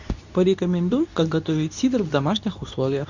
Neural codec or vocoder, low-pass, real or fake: codec, 24 kHz, 0.9 kbps, WavTokenizer, medium speech release version 2; 7.2 kHz; fake